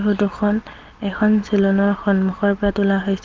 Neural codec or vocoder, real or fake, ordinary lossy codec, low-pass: none; real; Opus, 16 kbps; 7.2 kHz